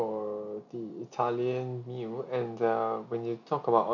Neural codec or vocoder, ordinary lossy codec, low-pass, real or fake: none; none; 7.2 kHz; real